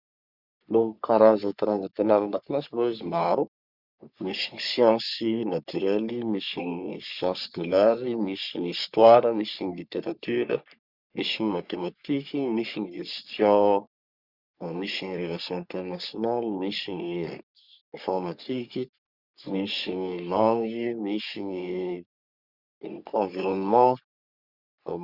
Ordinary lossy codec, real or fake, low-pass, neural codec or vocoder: Opus, 64 kbps; fake; 5.4 kHz; codec, 44.1 kHz, 3.4 kbps, Pupu-Codec